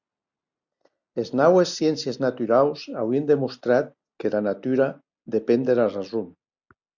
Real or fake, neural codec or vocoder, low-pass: real; none; 7.2 kHz